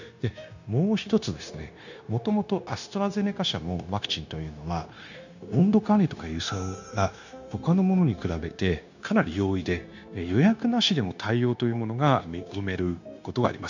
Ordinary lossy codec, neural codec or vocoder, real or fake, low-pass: none; codec, 16 kHz, 0.9 kbps, LongCat-Audio-Codec; fake; 7.2 kHz